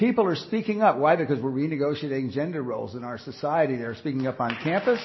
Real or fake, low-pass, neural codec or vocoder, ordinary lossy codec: real; 7.2 kHz; none; MP3, 24 kbps